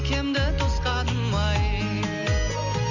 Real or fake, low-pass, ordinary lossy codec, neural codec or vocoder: real; 7.2 kHz; none; none